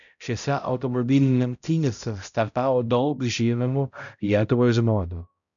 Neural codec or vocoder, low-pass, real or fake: codec, 16 kHz, 0.5 kbps, X-Codec, HuBERT features, trained on balanced general audio; 7.2 kHz; fake